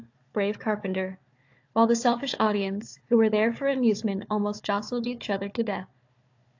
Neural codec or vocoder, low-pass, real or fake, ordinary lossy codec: codec, 16 kHz, 4 kbps, FunCodec, trained on Chinese and English, 50 frames a second; 7.2 kHz; fake; AAC, 48 kbps